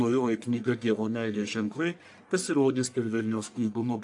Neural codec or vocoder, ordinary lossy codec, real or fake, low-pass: codec, 44.1 kHz, 1.7 kbps, Pupu-Codec; AAC, 64 kbps; fake; 10.8 kHz